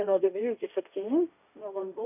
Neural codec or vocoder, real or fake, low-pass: codec, 16 kHz, 1.1 kbps, Voila-Tokenizer; fake; 3.6 kHz